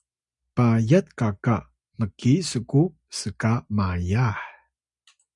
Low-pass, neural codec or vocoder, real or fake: 10.8 kHz; none; real